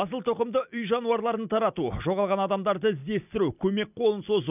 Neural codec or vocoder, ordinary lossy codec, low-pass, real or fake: none; none; 3.6 kHz; real